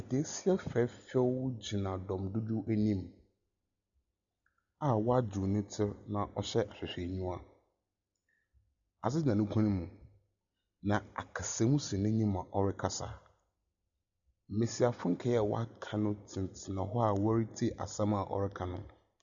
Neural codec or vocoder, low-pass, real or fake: none; 7.2 kHz; real